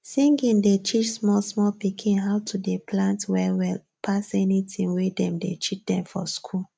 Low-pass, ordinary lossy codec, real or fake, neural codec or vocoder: none; none; real; none